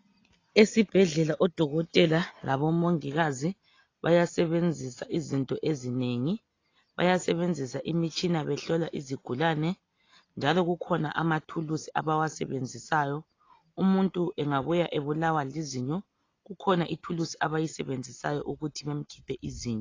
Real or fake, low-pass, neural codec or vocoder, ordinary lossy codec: real; 7.2 kHz; none; AAC, 32 kbps